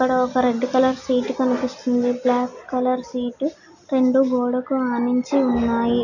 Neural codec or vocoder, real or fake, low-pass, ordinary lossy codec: none; real; 7.2 kHz; none